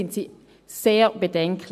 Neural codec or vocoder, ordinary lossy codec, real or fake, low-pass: none; AAC, 96 kbps; real; 14.4 kHz